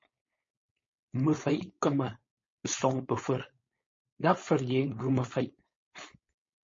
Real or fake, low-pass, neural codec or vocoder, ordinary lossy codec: fake; 7.2 kHz; codec, 16 kHz, 4.8 kbps, FACodec; MP3, 32 kbps